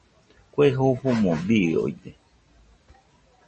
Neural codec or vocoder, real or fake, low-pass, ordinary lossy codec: none; real; 10.8 kHz; MP3, 32 kbps